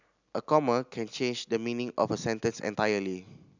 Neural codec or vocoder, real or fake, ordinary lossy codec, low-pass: none; real; none; 7.2 kHz